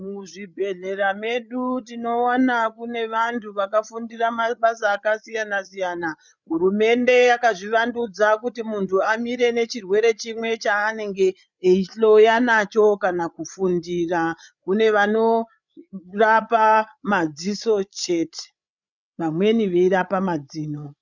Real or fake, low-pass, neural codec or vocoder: fake; 7.2 kHz; codec, 16 kHz, 8 kbps, FreqCodec, larger model